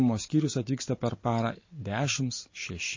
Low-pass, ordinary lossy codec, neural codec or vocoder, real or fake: 7.2 kHz; MP3, 32 kbps; none; real